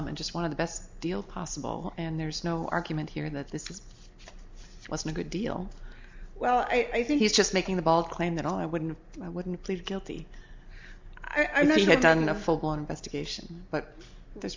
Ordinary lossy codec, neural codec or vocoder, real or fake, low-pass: MP3, 64 kbps; none; real; 7.2 kHz